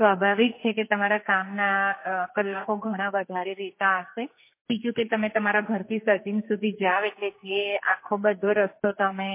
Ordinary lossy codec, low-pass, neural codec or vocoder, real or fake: MP3, 24 kbps; 3.6 kHz; codec, 44.1 kHz, 2.6 kbps, SNAC; fake